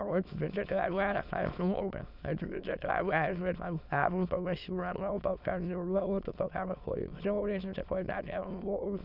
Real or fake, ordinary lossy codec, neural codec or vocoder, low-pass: fake; none; autoencoder, 22.05 kHz, a latent of 192 numbers a frame, VITS, trained on many speakers; 5.4 kHz